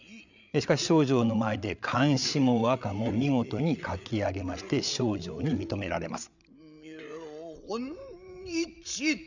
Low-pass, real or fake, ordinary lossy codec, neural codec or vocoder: 7.2 kHz; fake; none; codec, 16 kHz, 8 kbps, FreqCodec, larger model